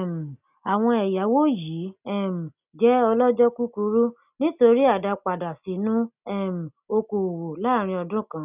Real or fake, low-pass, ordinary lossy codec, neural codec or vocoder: real; 3.6 kHz; none; none